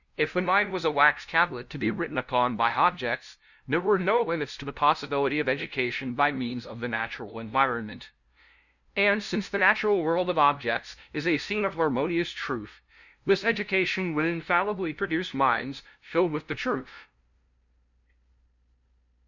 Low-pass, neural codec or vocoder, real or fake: 7.2 kHz; codec, 16 kHz, 0.5 kbps, FunCodec, trained on LibriTTS, 25 frames a second; fake